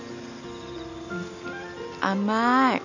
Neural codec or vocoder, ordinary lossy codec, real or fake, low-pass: none; none; real; 7.2 kHz